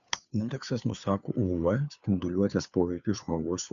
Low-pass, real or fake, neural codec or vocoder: 7.2 kHz; fake; codec, 16 kHz, 2 kbps, FunCodec, trained on Chinese and English, 25 frames a second